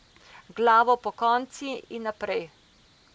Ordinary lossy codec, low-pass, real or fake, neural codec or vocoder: none; none; real; none